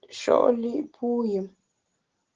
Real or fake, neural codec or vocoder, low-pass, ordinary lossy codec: real; none; 7.2 kHz; Opus, 16 kbps